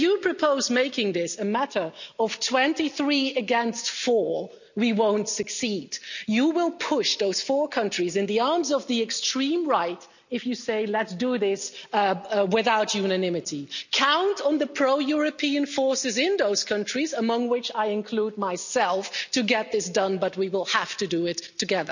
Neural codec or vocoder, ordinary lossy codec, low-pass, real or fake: none; none; 7.2 kHz; real